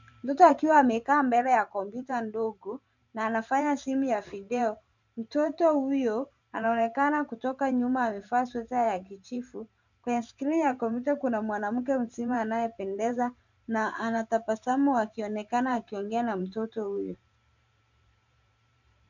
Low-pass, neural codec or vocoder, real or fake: 7.2 kHz; vocoder, 44.1 kHz, 128 mel bands every 512 samples, BigVGAN v2; fake